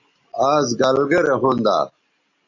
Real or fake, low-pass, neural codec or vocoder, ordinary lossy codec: real; 7.2 kHz; none; MP3, 48 kbps